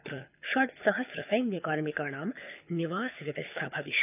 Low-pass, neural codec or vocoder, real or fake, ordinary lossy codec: 3.6 kHz; codec, 24 kHz, 6 kbps, HILCodec; fake; none